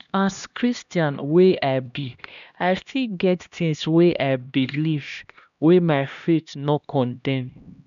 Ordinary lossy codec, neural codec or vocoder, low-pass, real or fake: none; codec, 16 kHz, 1 kbps, X-Codec, HuBERT features, trained on LibriSpeech; 7.2 kHz; fake